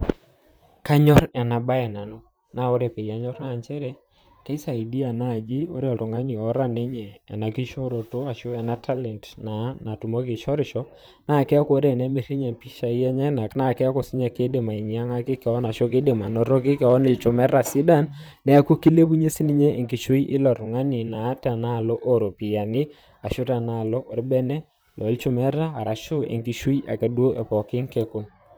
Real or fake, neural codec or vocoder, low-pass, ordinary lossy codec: fake; vocoder, 44.1 kHz, 128 mel bands, Pupu-Vocoder; none; none